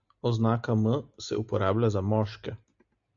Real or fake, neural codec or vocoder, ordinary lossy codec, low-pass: real; none; AAC, 64 kbps; 7.2 kHz